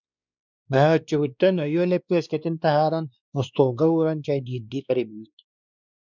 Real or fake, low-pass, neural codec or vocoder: fake; 7.2 kHz; codec, 16 kHz, 2 kbps, X-Codec, WavLM features, trained on Multilingual LibriSpeech